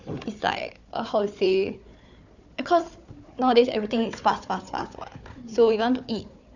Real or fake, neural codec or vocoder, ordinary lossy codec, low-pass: fake; codec, 16 kHz, 4 kbps, FunCodec, trained on Chinese and English, 50 frames a second; none; 7.2 kHz